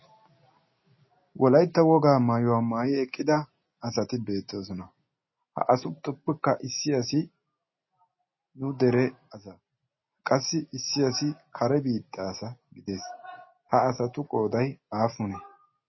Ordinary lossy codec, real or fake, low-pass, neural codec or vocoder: MP3, 24 kbps; real; 7.2 kHz; none